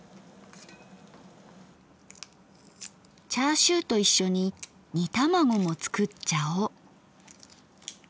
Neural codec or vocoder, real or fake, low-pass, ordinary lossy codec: none; real; none; none